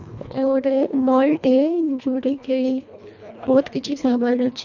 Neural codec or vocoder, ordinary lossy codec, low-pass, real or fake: codec, 24 kHz, 1.5 kbps, HILCodec; none; 7.2 kHz; fake